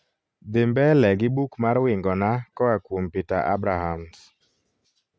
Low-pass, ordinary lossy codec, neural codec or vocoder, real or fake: none; none; none; real